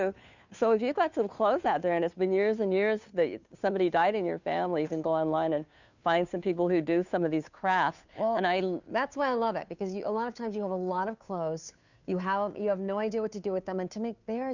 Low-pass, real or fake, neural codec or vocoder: 7.2 kHz; fake; codec, 16 kHz, 2 kbps, FunCodec, trained on Chinese and English, 25 frames a second